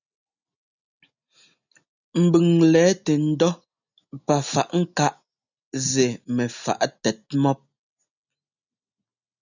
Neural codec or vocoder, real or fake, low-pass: none; real; 7.2 kHz